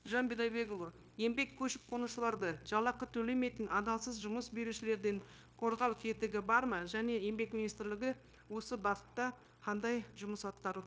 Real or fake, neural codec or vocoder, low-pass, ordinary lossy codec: fake; codec, 16 kHz, 0.9 kbps, LongCat-Audio-Codec; none; none